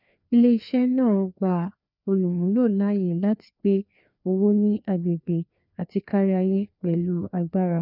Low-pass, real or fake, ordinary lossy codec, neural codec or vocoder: 5.4 kHz; fake; none; codec, 16 kHz, 2 kbps, FreqCodec, larger model